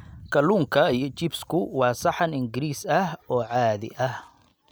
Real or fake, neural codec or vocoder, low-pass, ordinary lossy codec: real; none; none; none